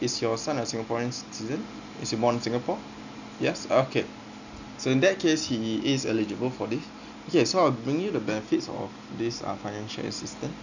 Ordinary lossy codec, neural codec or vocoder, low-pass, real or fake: none; none; 7.2 kHz; real